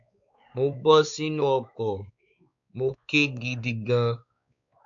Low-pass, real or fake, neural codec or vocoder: 7.2 kHz; fake; codec, 16 kHz, 4 kbps, X-Codec, WavLM features, trained on Multilingual LibriSpeech